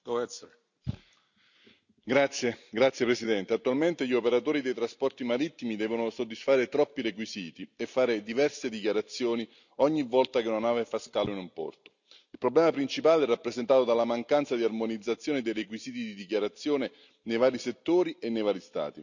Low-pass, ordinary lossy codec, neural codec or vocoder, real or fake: 7.2 kHz; none; none; real